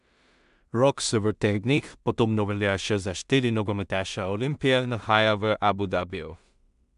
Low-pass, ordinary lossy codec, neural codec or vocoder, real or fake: 10.8 kHz; MP3, 96 kbps; codec, 16 kHz in and 24 kHz out, 0.4 kbps, LongCat-Audio-Codec, two codebook decoder; fake